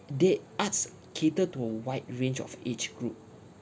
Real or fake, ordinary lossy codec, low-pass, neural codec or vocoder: real; none; none; none